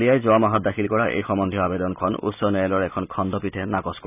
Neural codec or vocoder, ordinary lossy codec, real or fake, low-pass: none; none; real; 3.6 kHz